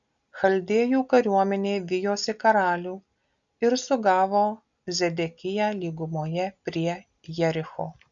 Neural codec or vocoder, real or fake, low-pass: none; real; 7.2 kHz